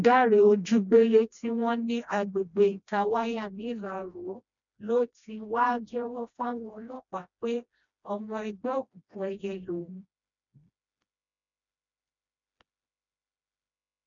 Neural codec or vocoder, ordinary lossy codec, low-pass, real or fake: codec, 16 kHz, 1 kbps, FreqCodec, smaller model; none; 7.2 kHz; fake